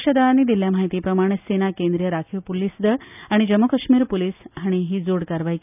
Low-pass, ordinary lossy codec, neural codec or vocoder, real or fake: 3.6 kHz; none; none; real